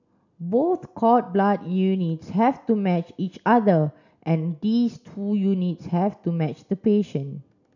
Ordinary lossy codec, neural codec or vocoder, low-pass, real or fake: none; none; 7.2 kHz; real